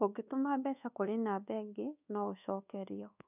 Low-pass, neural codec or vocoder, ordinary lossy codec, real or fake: 3.6 kHz; codec, 24 kHz, 1.2 kbps, DualCodec; none; fake